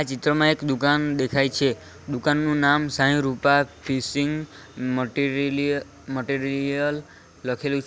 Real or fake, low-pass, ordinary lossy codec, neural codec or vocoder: real; none; none; none